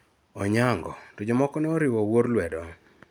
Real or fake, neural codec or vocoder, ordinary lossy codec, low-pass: real; none; none; none